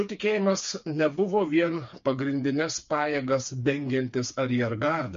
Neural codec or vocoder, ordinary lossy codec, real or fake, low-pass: codec, 16 kHz, 4 kbps, FreqCodec, smaller model; MP3, 48 kbps; fake; 7.2 kHz